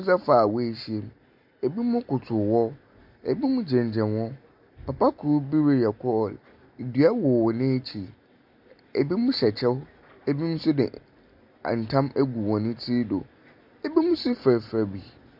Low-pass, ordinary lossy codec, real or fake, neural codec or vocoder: 5.4 kHz; MP3, 48 kbps; real; none